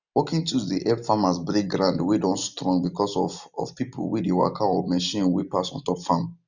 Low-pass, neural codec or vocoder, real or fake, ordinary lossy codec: 7.2 kHz; none; real; none